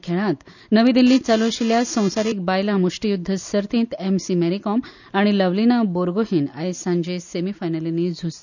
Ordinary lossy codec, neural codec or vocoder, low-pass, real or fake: none; none; 7.2 kHz; real